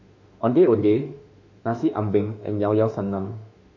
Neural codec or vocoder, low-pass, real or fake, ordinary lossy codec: autoencoder, 48 kHz, 32 numbers a frame, DAC-VAE, trained on Japanese speech; 7.2 kHz; fake; MP3, 48 kbps